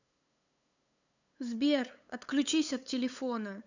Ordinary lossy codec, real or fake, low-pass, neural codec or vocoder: none; fake; 7.2 kHz; codec, 16 kHz, 8 kbps, FunCodec, trained on LibriTTS, 25 frames a second